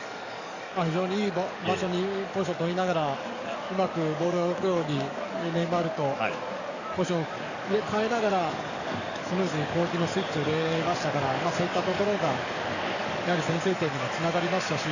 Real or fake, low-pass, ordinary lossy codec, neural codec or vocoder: fake; 7.2 kHz; none; codec, 44.1 kHz, 7.8 kbps, DAC